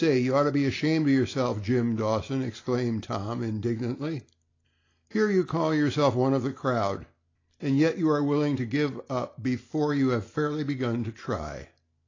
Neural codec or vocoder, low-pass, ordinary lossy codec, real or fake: none; 7.2 kHz; AAC, 32 kbps; real